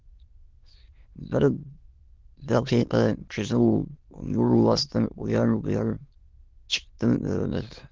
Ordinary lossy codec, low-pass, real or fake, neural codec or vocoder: Opus, 16 kbps; 7.2 kHz; fake; autoencoder, 22.05 kHz, a latent of 192 numbers a frame, VITS, trained on many speakers